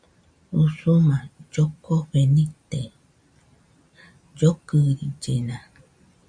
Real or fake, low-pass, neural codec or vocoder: real; 9.9 kHz; none